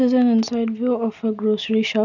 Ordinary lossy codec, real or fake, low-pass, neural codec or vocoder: none; real; 7.2 kHz; none